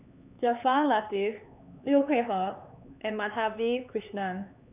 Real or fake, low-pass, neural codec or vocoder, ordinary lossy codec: fake; 3.6 kHz; codec, 16 kHz, 4 kbps, X-Codec, HuBERT features, trained on LibriSpeech; none